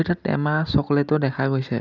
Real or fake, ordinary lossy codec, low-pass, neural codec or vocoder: real; none; 7.2 kHz; none